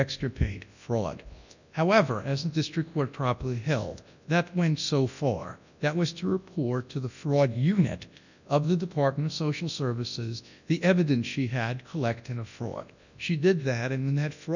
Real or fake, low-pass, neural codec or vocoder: fake; 7.2 kHz; codec, 24 kHz, 0.9 kbps, WavTokenizer, large speech release